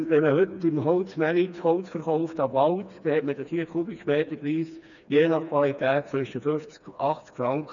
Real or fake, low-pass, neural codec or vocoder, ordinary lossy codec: fake; 7.2 kHz; codec, 16 kHz, 2 kbps, FreqCodec, smaller model; none